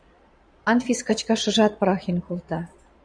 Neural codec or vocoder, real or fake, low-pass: vocoder, 22.05 kHz, 80 mel bands, Vocos; fake; 9.9 kHz